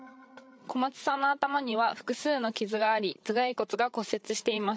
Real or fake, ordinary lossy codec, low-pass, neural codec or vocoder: fake; none; none; codec, 16 kHz, 8 kbps, FreqCodec, larger model